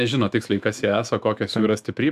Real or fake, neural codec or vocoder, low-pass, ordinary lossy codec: fake; autoencoder, 48 kHz, 128 numbers a frame, DAC-VAE, trained on Japanese speech; 14.4 kHz; AAC, 96 kbps